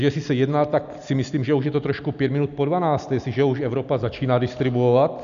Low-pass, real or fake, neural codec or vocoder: 7.2 kHz; real; none